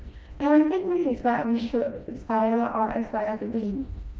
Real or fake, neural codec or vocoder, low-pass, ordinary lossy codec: fake; codec, 16 kHz, 1 kbps, FreqCodec, smaller model; none; none